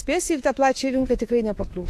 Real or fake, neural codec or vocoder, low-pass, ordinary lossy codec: fake; autoencoder, 48 kHz, 32 numbers a frame, DAC-VAE, trained on Japanese speech; 14.4 kHz; AAC, 64 kbps